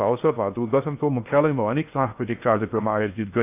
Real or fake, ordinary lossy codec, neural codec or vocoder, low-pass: fake; AAC, 32 kbps; codec, 16 kHz in and 24 kHz out, 0.6 kbps, FocalCodec, streaming, 2048 codes; 3.6 kHz